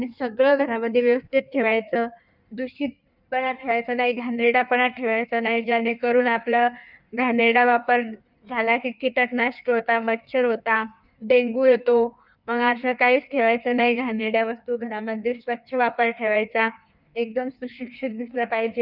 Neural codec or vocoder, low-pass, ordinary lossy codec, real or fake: codec, 16 kHz in and 24 kHz out, 1.1 kbps, FireRedTTS-2 codec; 5.4 kHz; none; fake